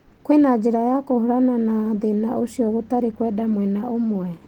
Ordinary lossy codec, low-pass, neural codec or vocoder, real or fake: Opus, 16 kbps; 19.8 kHz; none; real